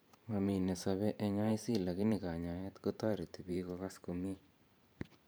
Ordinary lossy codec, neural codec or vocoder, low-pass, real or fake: none; vocoder, 44.1 kHz, 128 mel bands every 512 samples, BigVGAN v2; none; fake